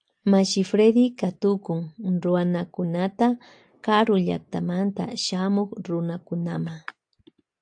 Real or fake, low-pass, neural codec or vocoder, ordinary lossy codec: real; 9.9 kHz; none; AAC, 64 kbps